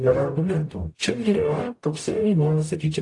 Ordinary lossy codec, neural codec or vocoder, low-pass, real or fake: AAC, 48 kbps; codec, 44.1 kHz, 0.9 kbps, DAC; 10.8 kHz; fake